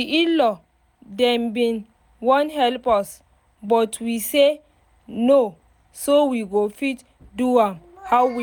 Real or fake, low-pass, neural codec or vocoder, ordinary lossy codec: real; none; none; none